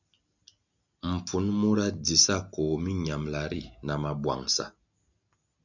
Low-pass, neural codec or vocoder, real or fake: 7.2 kHz; none; real